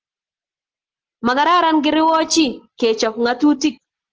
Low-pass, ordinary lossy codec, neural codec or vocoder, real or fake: 7.2 kHz; Opus, 16 kbps; none; real